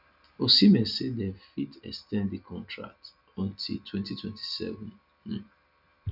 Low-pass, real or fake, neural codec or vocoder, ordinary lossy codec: 5.4 kHz; real; none; none